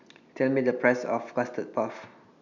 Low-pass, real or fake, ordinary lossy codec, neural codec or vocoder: 7.2 kHz; real; none; none